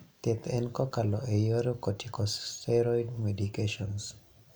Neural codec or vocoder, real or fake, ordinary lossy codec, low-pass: none; real; none; none